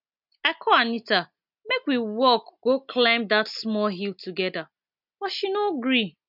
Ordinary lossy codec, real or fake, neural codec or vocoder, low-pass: none; real; none; 5.4 kHz